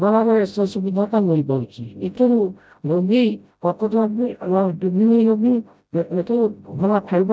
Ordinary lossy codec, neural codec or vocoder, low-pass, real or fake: none; codec, 16 kHz, 0.5 kbps, FreqCodec, smaller model; none; fake